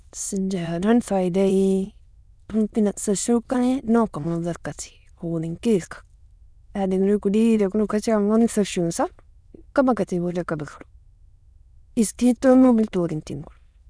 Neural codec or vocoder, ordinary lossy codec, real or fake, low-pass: autoencoder, 22.05 kHz, a latent of 192 numbers a frame, VITS, trained on many speakers; none; fake; none